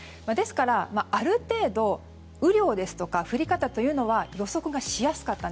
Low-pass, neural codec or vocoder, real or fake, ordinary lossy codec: none; none; real; none